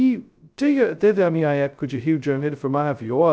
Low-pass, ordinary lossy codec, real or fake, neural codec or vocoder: none; none; fake; codec, 16 kHz, 0.2 kbps, FocalCodec